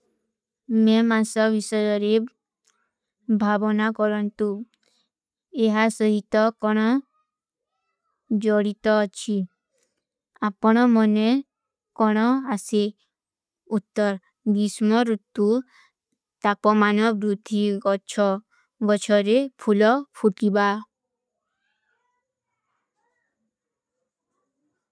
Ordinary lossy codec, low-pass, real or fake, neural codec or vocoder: none; none; real; none